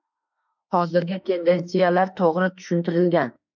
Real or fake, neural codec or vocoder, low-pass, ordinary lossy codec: fake; autoencoder, 48 kHz, 32 numbers a frame, DAC-VAE, trained on Japanese speech; 7.2 kHz; MP3, 64 kbps